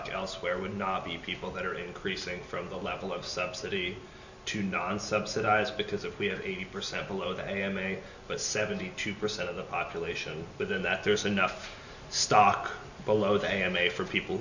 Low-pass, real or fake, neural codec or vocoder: 7.2 kHz; real; none